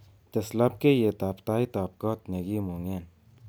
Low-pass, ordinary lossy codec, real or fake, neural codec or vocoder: none; none; real; none